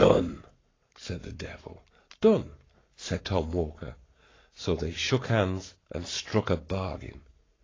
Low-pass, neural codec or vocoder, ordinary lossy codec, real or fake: 7.2 kHz; codec, 44.1 kHz, 7.8 kbps, Pupu-Codec; AAC, 32 kbps; fake